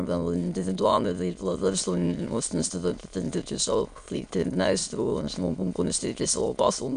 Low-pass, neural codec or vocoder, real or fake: 9.9 kHz; autoencoder, 22.05 kHz, a latent of 192 numbers a frame, VITS, trained on many speakers; fake